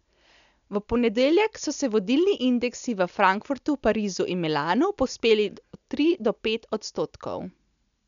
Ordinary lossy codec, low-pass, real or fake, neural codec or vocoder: none; 7.2 kHz; real; none